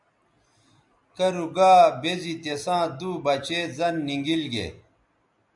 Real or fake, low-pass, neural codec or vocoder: real; 10.8 kHz; none